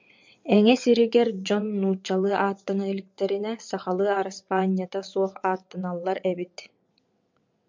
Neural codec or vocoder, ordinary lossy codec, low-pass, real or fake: vocoder, 22.05 kHz, 80 mel bands, WaveNeXt; MP3, 64 kbps; 7.2 kHz; fake